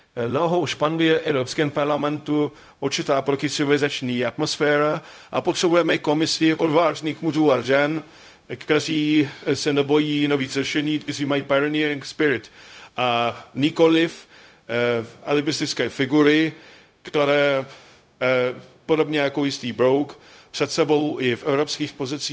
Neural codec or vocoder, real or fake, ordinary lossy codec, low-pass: codec, 16 kHz, 0.4 kbps, LongCat-Audio-Codec; fake; none; none